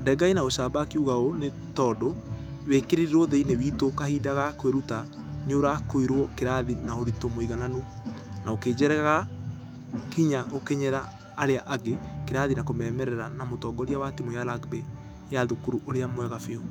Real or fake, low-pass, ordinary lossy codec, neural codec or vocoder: fake; 19.8 kHz; none; autoencoder, 48 kHz, 128 numbers a frame, DAC-VAE, trained on Japanese speech